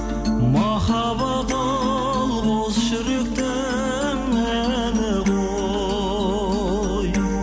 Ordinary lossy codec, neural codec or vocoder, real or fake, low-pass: none; none; real; none